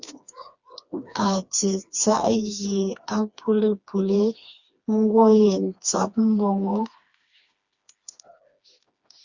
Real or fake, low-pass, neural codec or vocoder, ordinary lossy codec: fake; 7.2 kHz; codec, 16 kHz, 2 kbps, FreqCodec, smaller model; Opus, 64 kbps